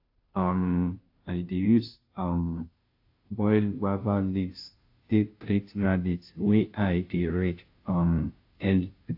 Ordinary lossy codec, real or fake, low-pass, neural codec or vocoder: AAC, 32 kbps; fake; 5.4 kHz; codec, 16 kHz, 0.5 kbps, FunCodec, trained on Chinese and English, 25 frames a second